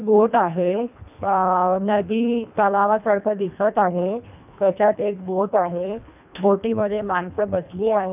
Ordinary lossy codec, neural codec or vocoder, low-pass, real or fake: none; codec, 24 kHz, 1.5 kbps, HILCodec; 3.6 kHz; fake